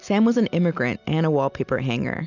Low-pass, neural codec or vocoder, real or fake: 7.2 kHz; none; real